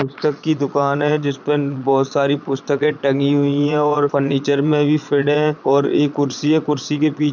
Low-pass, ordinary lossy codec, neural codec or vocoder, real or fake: 7.2 kHz; none; vocoder, 22.05 kHz, 80 mel bands, Vocos; fake